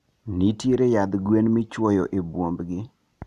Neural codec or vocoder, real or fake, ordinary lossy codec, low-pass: none; real; Opus, 64 kbps; 14.4 kHz